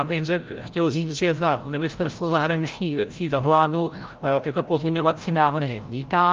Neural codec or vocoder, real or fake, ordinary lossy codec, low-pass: codec, 16 kHz, 0.5 kbps, FreqCodec, larger model; fake; Opus, 32 kbps; 7.2 kHz